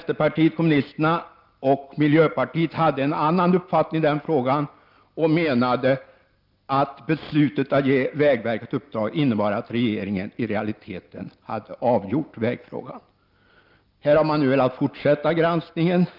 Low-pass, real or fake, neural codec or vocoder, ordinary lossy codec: 5.4 kHz; real; none; Opus, 24 kbps